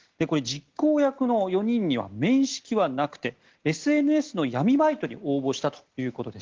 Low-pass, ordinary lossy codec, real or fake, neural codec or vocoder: 7.2 kHz; Opus, 16 kbps; real; none